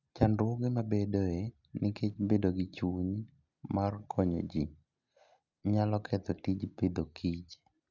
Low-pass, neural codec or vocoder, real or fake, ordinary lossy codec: 7.2 kHz; none; real; none